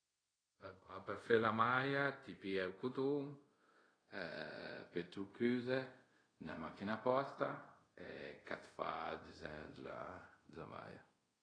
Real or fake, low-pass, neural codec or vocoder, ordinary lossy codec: fake; 9.9 kHz; codec, 24 kHz, 0.5 kbps, DualCodec; AAC, 32 kbps